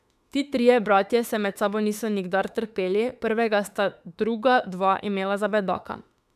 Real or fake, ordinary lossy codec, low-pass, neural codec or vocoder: fake; none; 14.4 kHz; autoencoder, 48 kHz, 32 numbers a frame, DAC-VAE, trained on Japanese speech